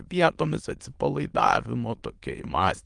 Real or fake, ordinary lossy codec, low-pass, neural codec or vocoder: fake; Opus, 24 kbps; 9.9 kHz; autoencoder, 22.05 kHz, a latent of 192 numbers a frame, VITS, trained on many speakers